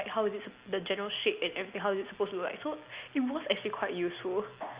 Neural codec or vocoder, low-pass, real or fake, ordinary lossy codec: none; 3.6 kHz; real; Opus, 64 kbps